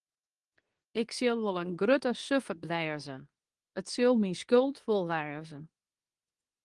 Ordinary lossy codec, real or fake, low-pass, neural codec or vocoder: Opus, 24 kbps; fake; 10.8 kHz; codec, 24 kHz, 0.9 kbps, WavTokenizer, medium speech release version 2